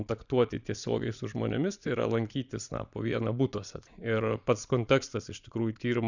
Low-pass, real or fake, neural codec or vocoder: 7.2 kHz; fake; vocoder, 22.05 kHz, 80 mel bands, Vocos